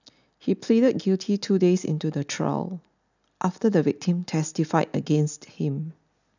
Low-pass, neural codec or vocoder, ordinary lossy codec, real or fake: 7.2 kHz; none; none; real